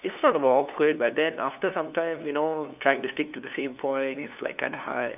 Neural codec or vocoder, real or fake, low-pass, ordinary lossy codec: codec, 16 kHz, 2 kbps, FunCodec, trained on LibriTTS, 25 frames a second; fake; 3.6 kHz; none